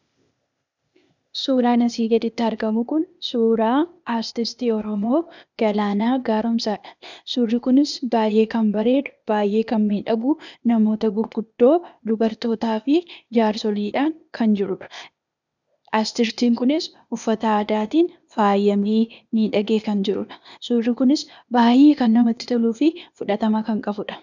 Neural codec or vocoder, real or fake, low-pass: codec, 16 kHz, 0.8 kbps, ZipCodec; fake; 7.2 kHz